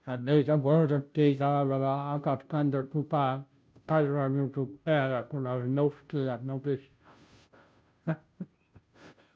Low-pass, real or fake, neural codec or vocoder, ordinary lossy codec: none; fake; codec, 16 kHz, 0.5 kbps, FunCodec, trained on Chinese and English, 25 frames a second; none